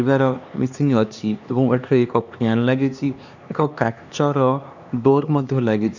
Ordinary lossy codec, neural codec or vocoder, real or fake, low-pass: none; codec, 16 kHz, 2 kbps, X-Codec, HuBERT features, trained on LibriSpeech; fake; 7.2 kHz